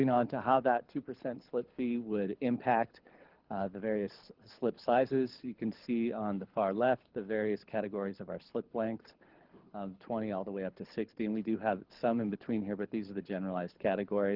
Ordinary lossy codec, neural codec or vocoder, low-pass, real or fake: Opus, 16 kbps; codec, 24 kHz, 6 kbps, HILCodec; 5.4 kHz; fake